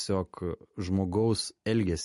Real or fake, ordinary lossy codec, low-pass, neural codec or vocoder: real; MP3, 48 kbps; 14.4 kHz; none